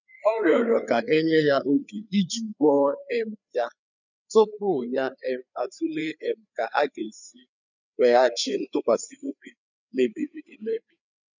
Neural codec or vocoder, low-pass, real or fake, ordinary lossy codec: codec, 16 kHz, 4 kbps, FreqCodec, larger model; 7.2 kHz; fake; none